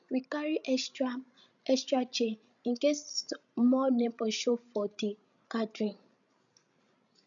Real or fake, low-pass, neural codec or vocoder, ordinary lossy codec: fake; 7.2 kHz; codec, 16 kHz, 16 kbps, FreqCodec, larger model; none